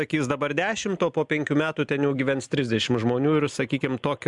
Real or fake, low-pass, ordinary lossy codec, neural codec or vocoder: real; 10.8 kHz; MP3, 96 kbps; none